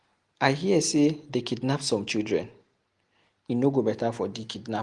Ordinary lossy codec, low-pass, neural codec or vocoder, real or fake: Opus, 24 kbps; 10.8 kHz; none; real